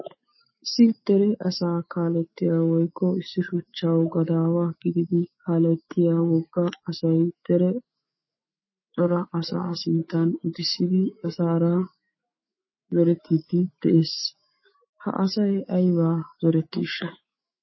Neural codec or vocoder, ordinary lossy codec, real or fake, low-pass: autoencoder, 48 kHz, 128 numbers a frame, DAC-VAE, trained on Japanese speech; MP3, 24 kbps; fake; 7.2 kHz